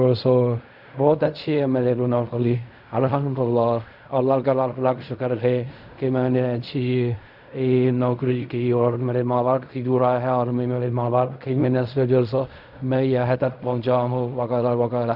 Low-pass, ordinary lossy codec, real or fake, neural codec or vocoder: 5.4 kHz; none; fake; codec, 16 kHz in and 24 kHz out, 0.4 kbps, LongCat-Audio-Codec, fine tuned four codebook decoder